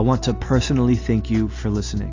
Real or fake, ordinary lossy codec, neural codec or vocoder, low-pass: real; AAC, 48 kbps; none; 7.2 kHz